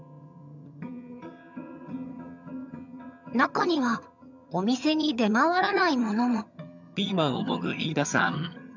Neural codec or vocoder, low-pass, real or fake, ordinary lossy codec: vocoder, 22.05 kHz, 80 mel bands, HiFi-GAN; 7.2 kHz; fake; none